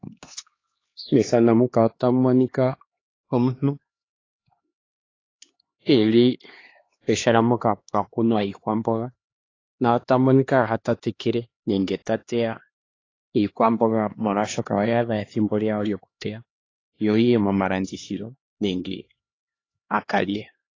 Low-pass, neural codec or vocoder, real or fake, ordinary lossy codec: 7.2 kHz; codec, 16 kHz, 2 kbps, X-Codec, HuBERT features, trained on LibriSpeech; fake; AAC, 32 kbps